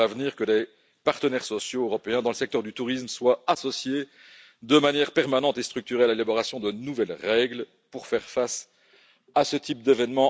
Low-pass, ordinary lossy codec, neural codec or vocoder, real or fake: none; none; none; real